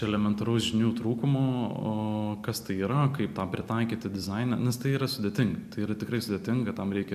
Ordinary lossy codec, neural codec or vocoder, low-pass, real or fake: AAC, 96 kbps; vocoder, 44.1 kHz, 128 mel bands every 256 samples, BigVGAN v2; 14.4 kHz; fake